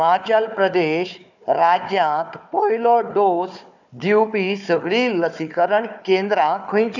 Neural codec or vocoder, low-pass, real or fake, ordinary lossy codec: codec, 16 kHz, 4 kbps, FunCodec, trained on Chinese and English, 50 frames a second; 7.2 kHz; fake; none